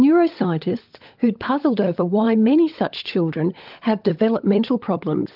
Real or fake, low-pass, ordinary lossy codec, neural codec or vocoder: fake; 5.4 kHz; Opus, 32 kbps; codec, 16 kHz, 16 kbps, FunCodec, trained on LibriTTS, 50 frames a second